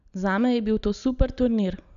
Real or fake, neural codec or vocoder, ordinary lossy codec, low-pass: real; none; none; 7.2 kHz